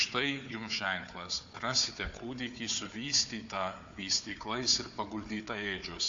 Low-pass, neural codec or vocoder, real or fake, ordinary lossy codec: 7.2 kHz; codec, 16 kHz, 4 kbps, FunCodec, trained on Chinese and English, 50 frames a second; fake; AAC, 64 kbps